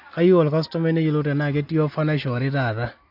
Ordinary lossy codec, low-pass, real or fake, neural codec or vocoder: AAC, 48 kbps; 5.4 kHz; real; none